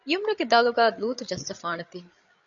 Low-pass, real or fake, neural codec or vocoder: 7.2 kHz; fake; codec, 16 kHz, 8 kbps, FreqCodec, larger model